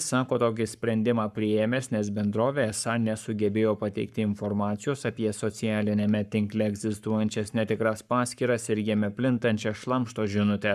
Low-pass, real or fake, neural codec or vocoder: 14.4 kHz; fake; codec, 44.1 kHz, 7.8 kbps, Pupu-Codec